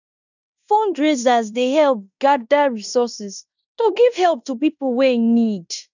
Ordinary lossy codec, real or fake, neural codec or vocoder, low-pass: none; fake; codec, 16 kHz in and 24 kHz out, 0.9 kbps, LongCat-Audio-Codec, fine tuned four codebook decoder; 7.2 kHz